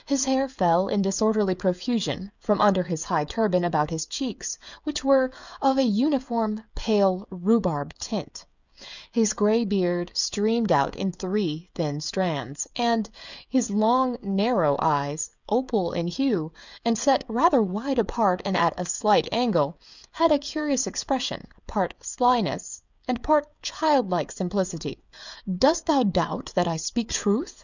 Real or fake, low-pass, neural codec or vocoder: fake; 7.2 kHz; codec, 16 kHz, 16 kbps, FreqCodec, smaller model